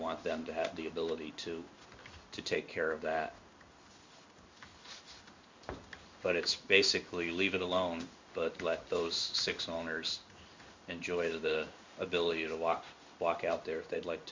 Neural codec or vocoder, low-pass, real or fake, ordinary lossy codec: codec, 16 kHz in and 24 kHz out, 1 kbps, XY-Tokenizer; 7.2 kHz; fake; MP3, 64 kbps